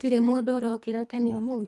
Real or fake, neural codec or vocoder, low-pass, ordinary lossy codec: fake; codec, 24 kHz, 1.5 kbps, HILCodec; none; none